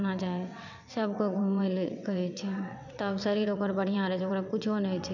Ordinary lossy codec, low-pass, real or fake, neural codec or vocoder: none; 7.2 kHz; fake; vocoder, 22.05 kHz, 80 mel bands, WaveNeXt